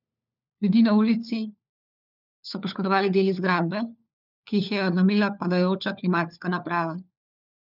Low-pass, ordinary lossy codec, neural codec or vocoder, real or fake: 5.4 kHz; none; codec, 16 kHz, 4 kbps, FunCodec, trained on LibriTTS, 50 frames a second; fake